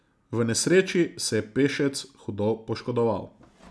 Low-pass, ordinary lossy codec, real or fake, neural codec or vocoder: none; none; real; none